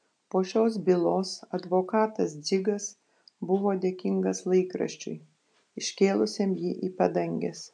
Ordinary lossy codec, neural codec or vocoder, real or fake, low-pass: AAC, 64 kbps; none; real; 9.9 kHz